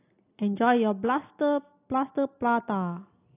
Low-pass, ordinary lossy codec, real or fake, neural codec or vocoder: 3.6 kHz; AAC, 24 kbps; real; none